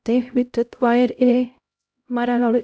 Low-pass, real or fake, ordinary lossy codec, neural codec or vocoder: none; fake; none; codec, 16 kHz, 0.5 kbps, X-Codec, HuBERT features, trained on LibriSpeech